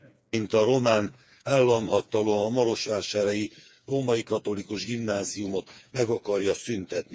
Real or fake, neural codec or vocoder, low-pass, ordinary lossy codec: fake; codec, 16 kHz, 4 kbps, FreqCodec, smaller model; none; none